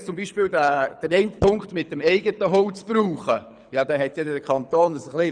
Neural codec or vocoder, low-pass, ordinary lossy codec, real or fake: codec, 24 kHz, 6 kbps, HILCodec; 9.9 kHz; none; fake